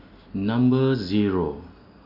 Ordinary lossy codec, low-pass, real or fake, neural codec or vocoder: AAC, 32 kbps; 5.4 kHz; real; none